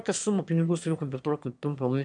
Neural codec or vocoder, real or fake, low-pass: autoencoder, 22.05 kHz, a latent of 192 numbers a frame, VITS, trained on one speaker; fake; 9.9 kHz